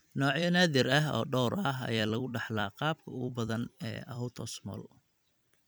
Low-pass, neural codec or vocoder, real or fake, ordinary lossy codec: none; vocoder, 44.1 kHz, 128 mel bands every 256 samples, BigVGAN v2; fake; none